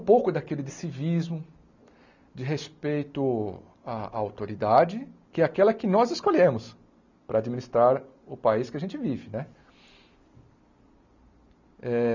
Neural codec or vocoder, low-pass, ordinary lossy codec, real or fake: none; 7.2 kHz; none; real